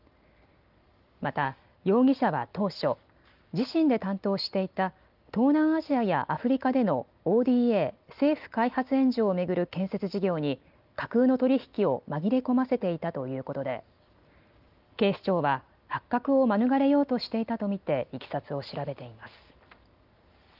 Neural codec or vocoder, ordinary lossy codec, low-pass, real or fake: none; Opus, 24 kbps; 5.4 kHz; real